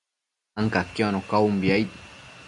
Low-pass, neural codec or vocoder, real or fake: 10.8 kHz; none; real